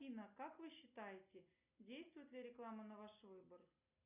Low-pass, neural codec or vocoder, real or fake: 3.6 kHz; none; real